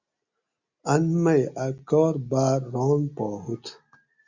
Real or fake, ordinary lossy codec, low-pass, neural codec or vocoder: real; Opus, 64 kbps; 7.2 kHz; none